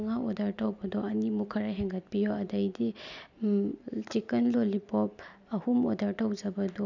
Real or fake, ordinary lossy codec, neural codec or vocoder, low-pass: real; none; none; 7.2 kHz